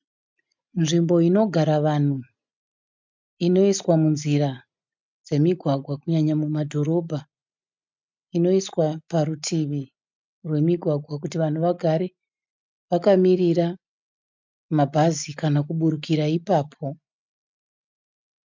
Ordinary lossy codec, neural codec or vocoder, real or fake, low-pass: MP3, 64 kbps; none; real; 7.2 kHz